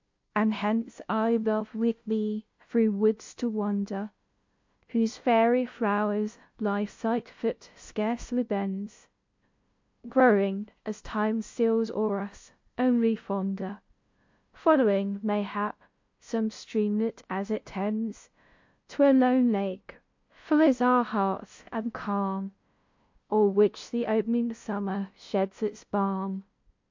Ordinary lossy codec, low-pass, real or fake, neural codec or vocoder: MP3, 48 kbps; 7.2 kHz; fake; codec, 16 kHz, 0.5 kbps, FunCodec, trained on LibriTTS, 25 frames a second